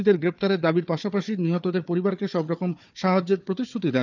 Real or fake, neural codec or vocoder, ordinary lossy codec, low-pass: fake; codec, 16 kHz, 4 kbps, FunCodec, trained on Chinese and English, 50 frames a second; none; 7.2 kHz